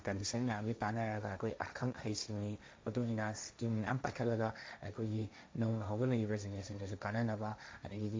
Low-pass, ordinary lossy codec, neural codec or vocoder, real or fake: none; none; codec, 16 kHz, 1.1 kbps, Voila-Tokenizer; fake